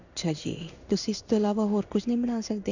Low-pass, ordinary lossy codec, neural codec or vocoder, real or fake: 7.2 kHz; none; codec, 16 kHz in and 24 kHz out, 1 kbps, XY-Tokenizer; fake